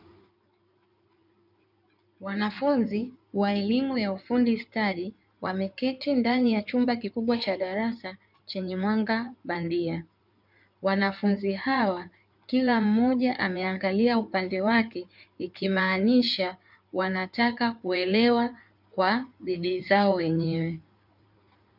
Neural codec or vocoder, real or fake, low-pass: codec, 16 kHz in and 24 kHz out, 2.2 kbps, FireRedTTS-2 codec; fake; 5.4 kHz